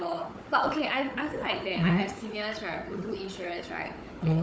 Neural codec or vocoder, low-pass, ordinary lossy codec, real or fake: codec, 16 kHz, 4 kbps, FunCodec, trained on Chinese and English, 50 frames a second; none; none; fake